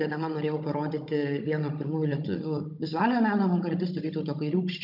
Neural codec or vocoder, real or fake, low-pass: codec, 16 kHz, 16 kbps, FunCodec, trained on Chinese and English, 50 frames a second; fake; 5.4 kHz